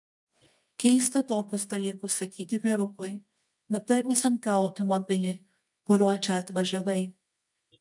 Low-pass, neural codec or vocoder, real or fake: 10.8 kHz; codec, 24 kHz, 0.9 kbps, WavTokenizer, medium music audio release; fake